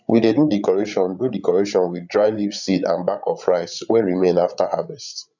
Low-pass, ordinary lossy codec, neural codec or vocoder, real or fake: 7.2 kHz; none; codec, 16 kHz, 8 kbps, FreqCodec, larger model; fake